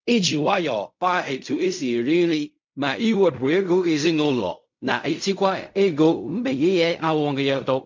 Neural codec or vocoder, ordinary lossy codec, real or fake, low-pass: codec, 16 kHz in and 24 kHz out, 0.4 kbps, LongCat-Audio-Codec, fine tuned four codebook decoder; MP3, 64 kbps; fake; 7.2 kHz